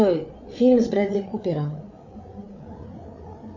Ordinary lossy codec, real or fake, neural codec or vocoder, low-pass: MP3, 32 kbps; fake; codec, 16 kHz, 8 kbps, FreqCodec, larger model; 7.2 kHz